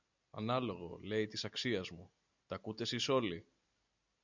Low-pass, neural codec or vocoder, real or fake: 7.2 kHz; none; real